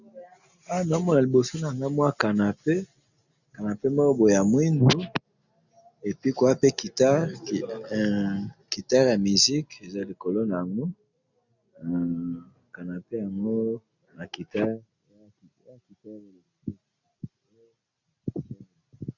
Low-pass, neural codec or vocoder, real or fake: 7.2 kHz; none; real